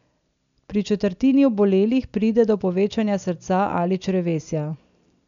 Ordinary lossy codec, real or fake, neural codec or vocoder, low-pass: none; real; none; 7.2 kHz